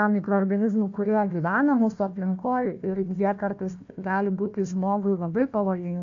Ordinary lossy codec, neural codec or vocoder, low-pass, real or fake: AAC, 48 kbps; codec, 16 kHz, 1 kbps, FunCodec, trained on Chinese and English, 50 frames a second; 7.2 kHz; fake